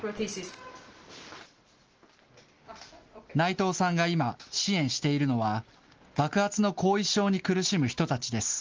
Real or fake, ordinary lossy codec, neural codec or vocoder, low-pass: real; Opus, 16 kbps; none; 7.2 kHz